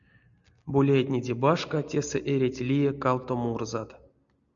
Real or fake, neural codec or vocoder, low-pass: real; none; 7.2 kHz